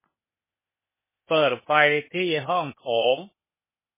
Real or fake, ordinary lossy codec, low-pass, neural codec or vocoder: fake; MP3, 16 kbps; 3.6 kHz; codec, 16 kHz, 0.8 kbps, ZipCodec